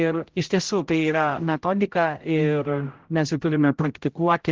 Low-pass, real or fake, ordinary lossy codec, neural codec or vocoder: 7.2 kHz; fake; Opus, 16 kbps; codec, 16 kHz, 0.5 kbps, X-Codec, HuBERT features, trained on general audio